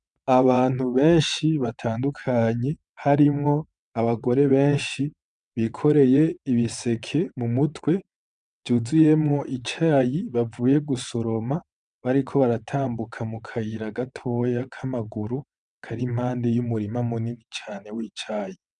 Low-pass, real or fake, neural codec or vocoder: 9.9 kHz; fake; vocoder, 22.05 kHz, 80 mel bands, WaveNeXt